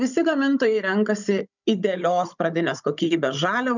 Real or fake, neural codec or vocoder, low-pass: fake; codec, 16 kHz, 16 kbps, FunCodec, trained on Chinese and English, 50 frames a second; 7.2 kHz